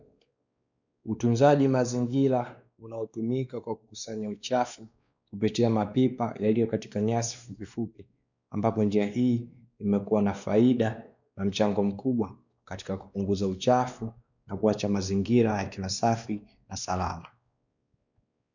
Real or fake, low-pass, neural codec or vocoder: fake; 7.2 kHz; codec, 16 kHz, 2 kbps, X-Codec, WavLM features, trained on Multilingual LibriSpeech